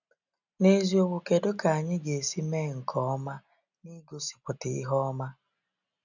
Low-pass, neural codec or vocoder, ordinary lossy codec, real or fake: 7.2 kHz; none; none; real